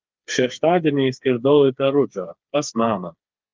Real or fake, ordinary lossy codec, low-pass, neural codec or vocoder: fake; Opus, 24 kbps; 7.2 kHz; codec, 16 kHz, 4 kbps, FreqCodec, smaller model